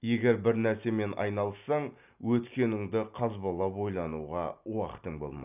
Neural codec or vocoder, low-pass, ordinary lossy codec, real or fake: none; 3.6 kHz; none; real